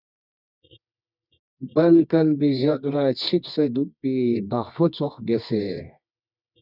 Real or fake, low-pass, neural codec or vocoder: fake; 5.4 kHz; codec, 24 kHz, 0.9 kbps, WavTokenizer, medium music audio release